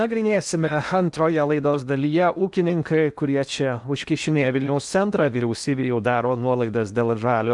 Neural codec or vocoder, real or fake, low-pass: codec, 16 kHz in and 24 kHz out, 0.8 kbps, FocalCodec, streaming, 65536 codes; fake; 10.8 kHz